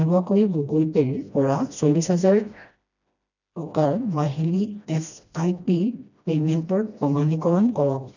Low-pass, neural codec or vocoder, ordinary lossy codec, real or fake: 7.2 kHz; codec, 16 kHz, 1 kbps, FreqCodec, smaller model; none; fake